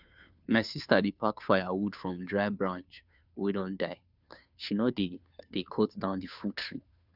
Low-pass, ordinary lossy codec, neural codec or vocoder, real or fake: 5.4 kHz; none; codec, 16 kHz, 2 kbps, FunCodec, trained on Chinese and English, 25 frames a second; fake